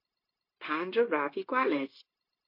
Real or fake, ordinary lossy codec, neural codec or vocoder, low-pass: fake; MP3, 32 kbps; codec, 16 kHz, 0.9 kbps, LongCat-Audio-Codec; 5.4 kHz